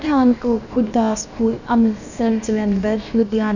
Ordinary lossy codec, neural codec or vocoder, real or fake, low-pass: none; codec, 16 kHz, about 1 kbps, DyCAST, with the encoder's durations; fake; 7.2 kHz